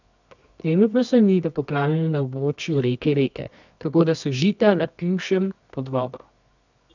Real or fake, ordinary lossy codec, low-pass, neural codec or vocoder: fake; none; 7.2 kHz; codec, 24 kHz, 0.9 kbps, WavTokenizer, medium music audio release